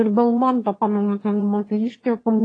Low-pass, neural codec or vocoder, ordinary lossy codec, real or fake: 9.9 kHz; autoencoder, 22.05 kHz, a latent of 192 numbers a frame, VITS, trained on one speaker; AAC, 32 kbps; fake